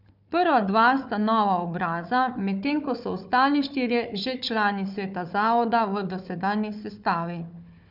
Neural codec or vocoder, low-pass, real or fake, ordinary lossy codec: codec, 16 kHz, 4 kbps, FunCodec, trained on Chinese and English, 50 frames a second; 5.4 kHz; fake; none